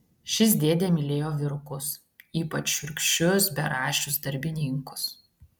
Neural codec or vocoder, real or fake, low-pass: none; real; 19.8 kHz